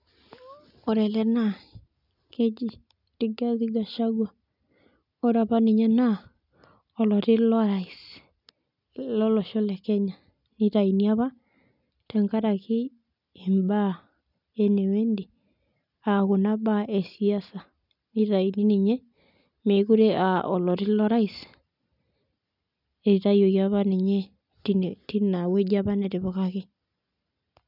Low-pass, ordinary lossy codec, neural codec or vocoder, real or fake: 5.4 kHz; none; none; real